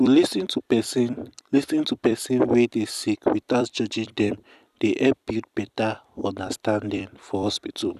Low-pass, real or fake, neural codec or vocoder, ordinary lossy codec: 14.4 kHz; fake; vocoder, 44.1 kHz, 128 mel bands every 256 samples, BigVGAN v2; none